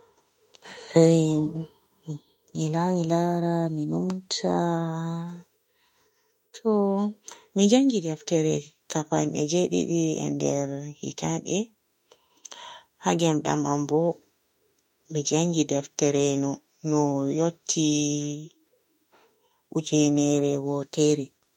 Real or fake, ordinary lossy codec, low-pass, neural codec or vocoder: fake; MP3, 64 kbps; 19.8 kHz; autoencoder, 48 kHz, 32 numbers a frame, DAC-VAE, trained on Japanese speech